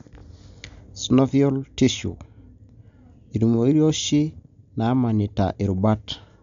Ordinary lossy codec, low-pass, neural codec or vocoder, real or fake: MP3, 64 kbps; 7.2 kHz; none; real